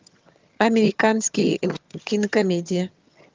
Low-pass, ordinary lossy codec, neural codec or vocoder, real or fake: 7.2 kHz; Opus, 24 kbps; vocoder, 22.05 kHz, 80 mel bands, HiFi-GAN; fake